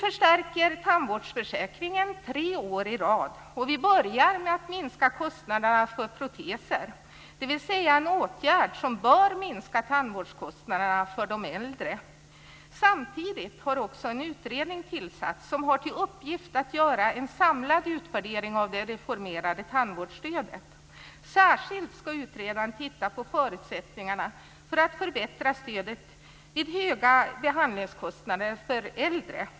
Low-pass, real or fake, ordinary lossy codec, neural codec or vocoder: none; real; none; none